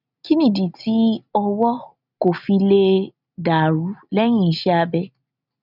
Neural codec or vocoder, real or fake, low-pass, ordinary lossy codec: none; real; 5.4 kHz; none